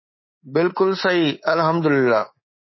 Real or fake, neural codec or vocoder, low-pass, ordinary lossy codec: fake; codec, 24 kHz, 3.1 kbps, DualCodec; 7.2 kHz; MP3, 24 kbps